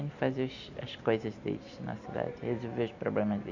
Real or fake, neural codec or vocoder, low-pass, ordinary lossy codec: real; none; 7.2 kHz; none